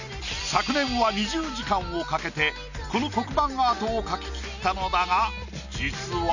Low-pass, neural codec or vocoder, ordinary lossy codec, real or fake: 7.2 kHz; none; none; real